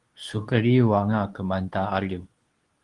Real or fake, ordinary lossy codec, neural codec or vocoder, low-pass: fake; Opus, 32 kbps; codec, 24 kHz, 0.9 kbps, WavTokenizer, medium speech release version 2; 10.8 kHz